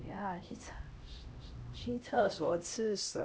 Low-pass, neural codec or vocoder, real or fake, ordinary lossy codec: none; codec, 16 kHz, 1 kbps, X-Codec, HuBERT features, trained on LibriSpeech; fake; none